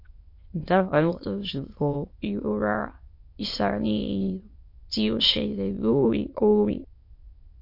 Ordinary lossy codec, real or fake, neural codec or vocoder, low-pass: MP3, 32 kbps; fake; autoencoder, 22.05 kHz, a latent of 192 numbers a frame, VITS, trained on many speakers; 5.4 kHz